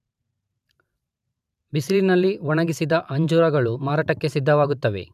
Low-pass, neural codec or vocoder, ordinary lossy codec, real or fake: 14.4 kHz; vocoder, 44.1 kHz, 128 mel bands every 512 samples, BigVGAN v2; none; fake